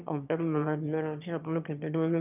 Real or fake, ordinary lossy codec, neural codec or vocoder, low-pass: fake; none; autoencoder, 22.05 kHz, a latent of 192 numbers a frame, VITS, trained on one speaker; 3.6 kHz